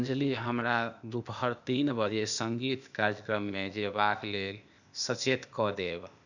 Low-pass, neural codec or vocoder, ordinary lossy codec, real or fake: 7.2 kHz; codec, 16 kHz, 0.8 kbps, ZipCodec; none; fake